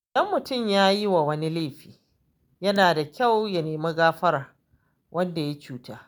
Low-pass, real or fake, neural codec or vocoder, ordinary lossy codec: none; real; none; none